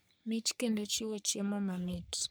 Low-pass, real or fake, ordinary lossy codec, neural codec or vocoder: none; fake; none; codec, 44.1 kHz, 3.4 kbps, Pupu-Codec